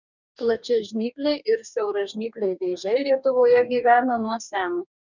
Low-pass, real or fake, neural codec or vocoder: 7.2 kHz; fake; codec, 44.1 kHz, 2.6 kbps, DAC